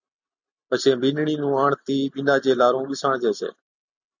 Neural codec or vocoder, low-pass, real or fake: none; 7.2 kHz; real